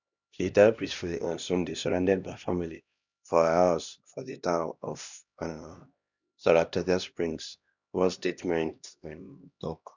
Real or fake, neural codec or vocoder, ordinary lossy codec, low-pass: fake; codec, 16 kHz, 1 kbps, X-Codec, HuBERT features, trained on LibriSpeech; none; 7.2 kHz